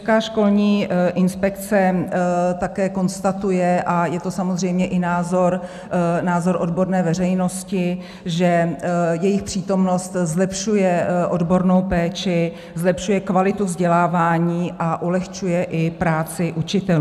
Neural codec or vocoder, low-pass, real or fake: none; 14.4 kHz; real